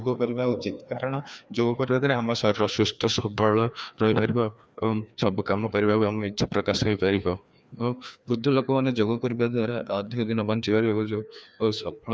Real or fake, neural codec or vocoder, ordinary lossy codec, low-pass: fake; codec, 16 kHz, 2 kbps, FreqCodec, larger model; none; none